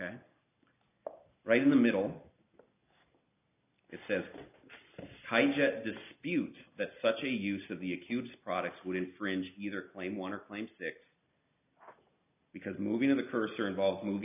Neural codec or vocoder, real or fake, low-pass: none; real; 3.6 kHz